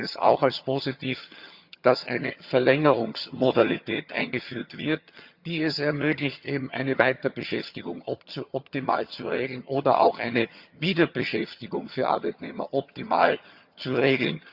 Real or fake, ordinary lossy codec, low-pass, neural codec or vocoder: fake; Opus, 64 kbps; 5.4 kHz; vocoder, 22.05 kHz, 80 mel bands, HiFi-GAN